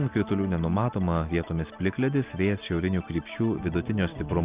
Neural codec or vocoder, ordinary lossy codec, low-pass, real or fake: none; Opus, 32 kbps; 3.6 kHz; real